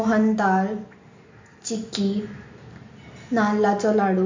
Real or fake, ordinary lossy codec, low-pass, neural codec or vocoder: real; MP3, 64 kbps; 7.2 kHz; none